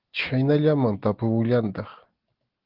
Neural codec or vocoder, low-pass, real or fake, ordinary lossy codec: none; 5.4 kHz; real; Opus, 16 kbps